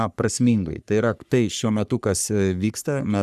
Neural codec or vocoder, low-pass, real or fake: codec, 44.1 kHz, 3.4 kbps, Pupu-Codec; 14.4 kHz; fake